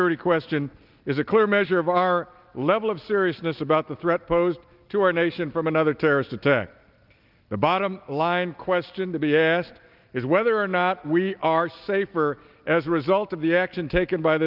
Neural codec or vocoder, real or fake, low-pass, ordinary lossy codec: none; real; 5.4 kHz; Opus, 24 kbps